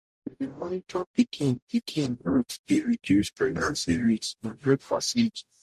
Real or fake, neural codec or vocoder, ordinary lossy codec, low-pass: fake; codec, 44.1 kHz, 0.9 kbps, DAC; MP3, 64 kbps; 14.4 kHz